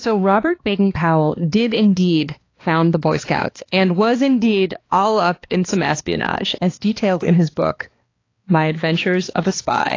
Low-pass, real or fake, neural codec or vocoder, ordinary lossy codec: 7.2 kHz; fake; codec, 16 kHz, 2 kbps, X-Codec, HuBERT features, trained on balanced general audio; AAC, 32 kbps